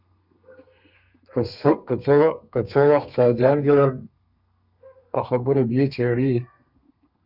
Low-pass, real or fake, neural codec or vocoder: 5.4 kHz; fake; codec, 32 kHz, 1.9 kbps, SNAC